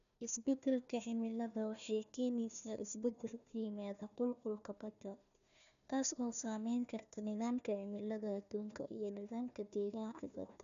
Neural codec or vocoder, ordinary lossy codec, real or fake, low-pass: codec, 16 kHz, 1 kbps, FunCodec, trained on Chinese and English, 50 frames a second; none; fake; 7.2 kHz